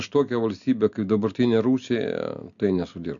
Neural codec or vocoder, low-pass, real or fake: none; 7.2 kHz; real